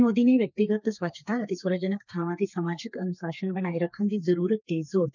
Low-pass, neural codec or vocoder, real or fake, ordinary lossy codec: 7.2 kHz; codec, 32 kHz, 1.9 kbps, SNAC; fake; none